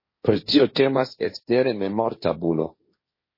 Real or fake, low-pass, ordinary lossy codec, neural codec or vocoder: fake; 5.4 kHz; MP3, 24 kbps; codec, 16 kHz, 1.1 kbps, Voila-Tokenizer